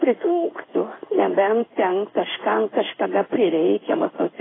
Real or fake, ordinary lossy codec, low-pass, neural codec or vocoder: fake; AAC, 16 kbps; 7.2 kHz; codec, 16 kHz in and 24 kHz out, 1 kbps, XY-Tokenizer